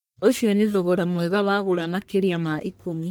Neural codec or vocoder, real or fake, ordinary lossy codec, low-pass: codec, 44.1 kHz, 1.7 kbps, Pupu-Codec; fake; none; none